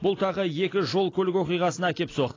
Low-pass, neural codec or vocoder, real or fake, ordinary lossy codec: 7.2 kHz; none; real; AAC, 32 kbps